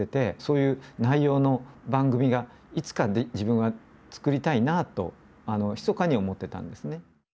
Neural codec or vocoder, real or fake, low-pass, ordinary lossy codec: none; real; none; none